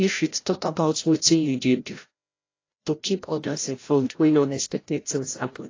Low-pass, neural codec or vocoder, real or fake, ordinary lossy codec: 7.2 kHz; codec, 16 kHz, 0.5 kbps, FreqCodec, larger model; fake; AAC, 32 kbps